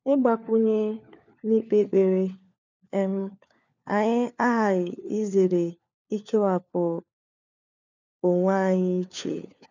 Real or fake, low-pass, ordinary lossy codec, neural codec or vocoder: fake; 7.2 kHz; none; codec, 16 kHz, 4 kbps, FunCodec, trained on LibriTTS, 50 frames a second